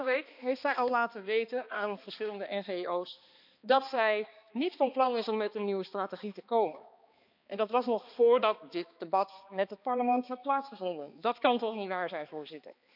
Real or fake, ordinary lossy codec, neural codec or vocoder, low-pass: fake; none; codec, 16 kHz, 2 kbps, X-Codec, HuBERT features, trained on balanced general audio; 5.4 kHz